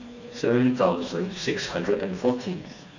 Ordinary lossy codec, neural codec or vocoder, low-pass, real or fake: none; codec, 16 kHz, 2 kbps, FreqCodec, smaller model; 7.2 kHz; fake